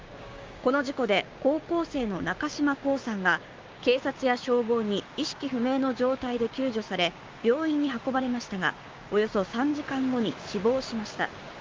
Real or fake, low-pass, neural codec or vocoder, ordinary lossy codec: fake; 7.2 kHz; codec, 16 kHz, 6 kbps, DAC; Opus, 32 kbps